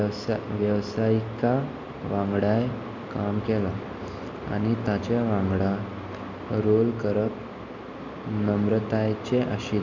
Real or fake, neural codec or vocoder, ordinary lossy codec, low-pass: real; none; MP3, 64 kbps; 7.2 kHz